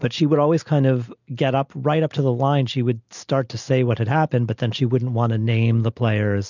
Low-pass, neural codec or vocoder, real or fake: 7.2 kHz; none; real